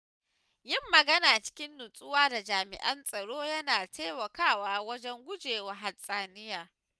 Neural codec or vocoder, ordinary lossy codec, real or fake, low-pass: none; none; real; none